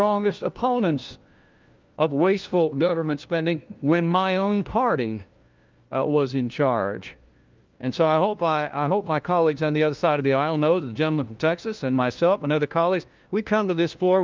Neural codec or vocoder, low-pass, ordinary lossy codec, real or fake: codec, 16 kHz, 1 kbps, FunCodec, trained on LibriTTS, 50 frames a second; 7.2 kHz; Opus, 24 kbps; fake